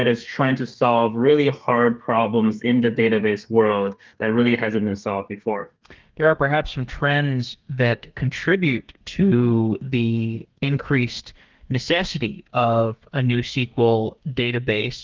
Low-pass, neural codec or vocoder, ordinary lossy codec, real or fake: 7.2 kHz; codec, 32 kHz, 1.9 kbps, SNAC; Opus, 32 kbps; fake